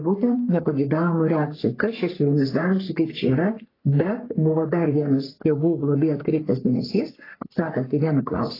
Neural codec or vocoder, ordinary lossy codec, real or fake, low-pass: codec, 44.1 kHz, 3.4 kbps, Pupu-Codec; AAC, 24 kbps; fake; 5.4 kHz